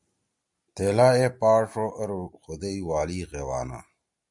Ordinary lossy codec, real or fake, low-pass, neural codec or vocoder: MP3, 96 kbps; real; 10.8 kHz; none